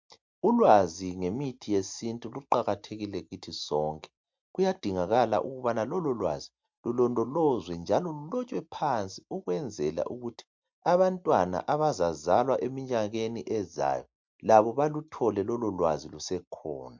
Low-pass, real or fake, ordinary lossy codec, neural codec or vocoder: 7.2 kHz; real; MP3, 64 kbps; none